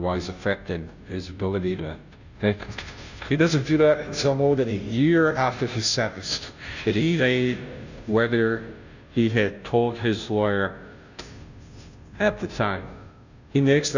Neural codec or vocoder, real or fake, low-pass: codec, 16 kHz, 0.5 kbps, FunCodec, trained on Chinese and English, 25 frames a second; fake; 7.2 kHz